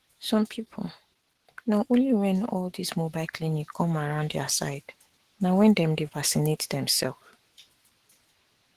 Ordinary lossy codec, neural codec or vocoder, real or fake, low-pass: Opus, 16 kbps; autoencoder, 48 kHz, 128 numbers a frame, DAC-VAE, trained on Japanese speech; fake; 14.4 kHz